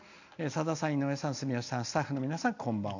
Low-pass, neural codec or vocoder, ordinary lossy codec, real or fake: 7.2 kHz; none; none; real